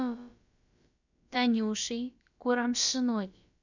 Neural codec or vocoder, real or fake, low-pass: codec, 16 kHz, about 1 kbps, DyCAST, with the encoder's durations; fake; 7.2 kHz